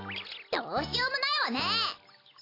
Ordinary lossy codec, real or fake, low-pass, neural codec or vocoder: AAC, 32 kbps; real; 5.4 kHz; none